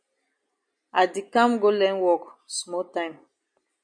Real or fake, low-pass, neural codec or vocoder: real; 9.9 kHz; none